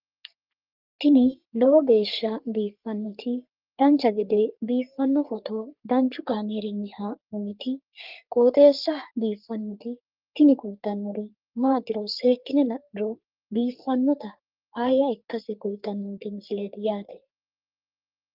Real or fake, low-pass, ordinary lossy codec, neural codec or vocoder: fake; 5.4 kHz; Opus, 24 kbps; codec, 44.1 kHz, 3.4 kbps, Pupu-Codec